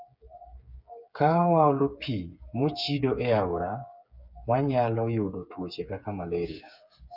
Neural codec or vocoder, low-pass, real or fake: codec, 16 kHz, 8 kbps, FreqCodec, smaller model; 5.4 kHz; fake